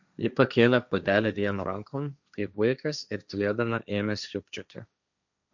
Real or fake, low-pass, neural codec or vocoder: fake; 7.2 kHz; codec, 16 kHz, 1.1 kbps, Voila-Tokenizer